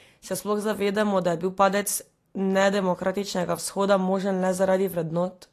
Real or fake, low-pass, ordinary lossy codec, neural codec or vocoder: real; 14.4 kHz; AAC, 48 kbps; none